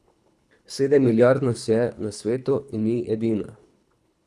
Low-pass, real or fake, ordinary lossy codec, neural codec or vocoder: none; fake; none; codec, 24 kHz, 3 kbps, HILCodec